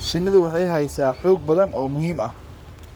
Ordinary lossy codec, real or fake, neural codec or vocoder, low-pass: none; fake; codec, 44.1 kHz, 3.4 kbps, Pupu-Codec; none